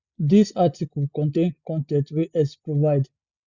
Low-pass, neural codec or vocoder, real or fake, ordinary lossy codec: 7.2 kHz; none; real; Opus, 64 kbps